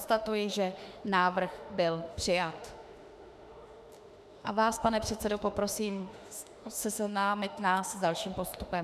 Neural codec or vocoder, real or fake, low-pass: autoencoder, 48 kHz, 32 numbers a frame, DAC-VAE, trained on Japanese speech; fake; 14.4 kHz